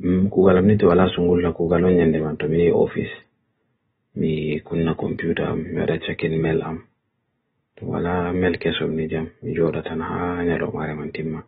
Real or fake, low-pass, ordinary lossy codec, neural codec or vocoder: real; 19.8 kHz; AAC, 16 kbps; none